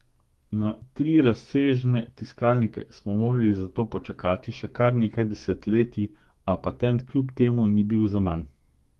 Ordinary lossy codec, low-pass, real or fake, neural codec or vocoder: Opus, 24 kbps; 14.4 kHz; fake; codec, 32 kHz, 1.9 kbps, SNAC